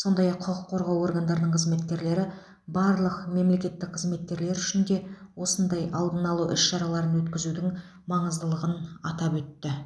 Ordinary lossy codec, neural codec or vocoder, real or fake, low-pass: none; none; real; none